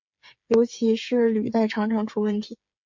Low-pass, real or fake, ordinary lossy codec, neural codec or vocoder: 7.2 kHz; fake; MP3, 48 kbps; codec, 16 kHz, 8 kbps, FreqCodec, smaller model